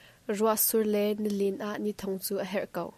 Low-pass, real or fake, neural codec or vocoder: 14.4 kHz; real; none